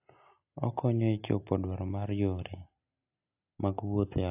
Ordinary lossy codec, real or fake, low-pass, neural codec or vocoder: AAC, 32 kbps; real; 3.6 kHz; none